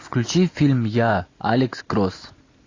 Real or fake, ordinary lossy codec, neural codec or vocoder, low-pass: real; AAC, 32 kbps; none; 7.2 kHz